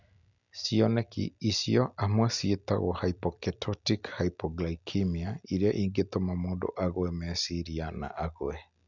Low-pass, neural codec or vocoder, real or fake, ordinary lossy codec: 7.2 kHz; none; real; none